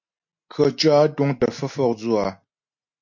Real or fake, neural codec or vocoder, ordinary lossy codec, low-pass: real; none; MP3, 48 kbps; 7.2 kHz